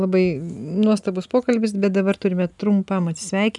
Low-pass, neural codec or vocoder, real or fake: 10.8 kHz; none; real